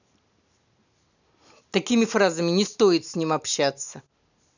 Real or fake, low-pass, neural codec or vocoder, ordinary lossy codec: real; 7.2 kHz; none; none